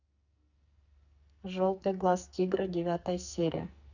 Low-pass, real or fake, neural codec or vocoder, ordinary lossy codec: 7.2 kHz; fake; codec, 44.1 kHz, 2.6 kbps, SNAC; none